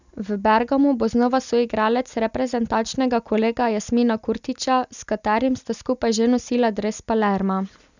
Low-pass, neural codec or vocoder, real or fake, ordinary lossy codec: 7.2 kHz; none; real; none